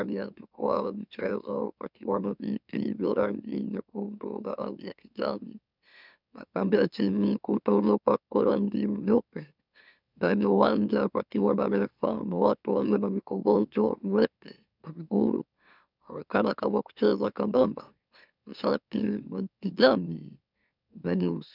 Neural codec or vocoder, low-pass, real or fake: autoencoder, 44.1 kHz, a latent of 192 numbers a frame, MeloTTS; 5.4 kHz; fake